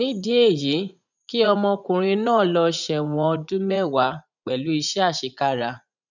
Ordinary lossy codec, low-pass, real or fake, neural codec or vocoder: none; 7.2 kHz; fake; vocoder, 44.1 kHz, 80 mel bands, Vocos